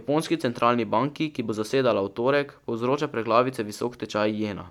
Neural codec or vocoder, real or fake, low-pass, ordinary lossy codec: none; real; 19.8 kHz; none